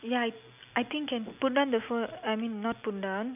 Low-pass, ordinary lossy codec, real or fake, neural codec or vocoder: 3.6 kHz; none; real; none